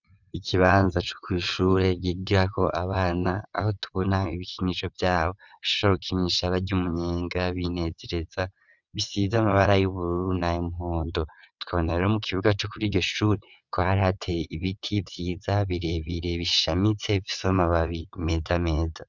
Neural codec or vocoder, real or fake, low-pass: vocoder, 22.05 kHz, 80 mel bands, WaveNeXt; fake; 7.2 kHz